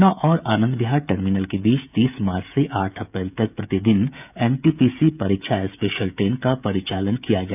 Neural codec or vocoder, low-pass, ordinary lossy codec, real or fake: codec, 16 kHz, 16 kbps, FreqCodec, smaller model; 3.6 kHz; none; fake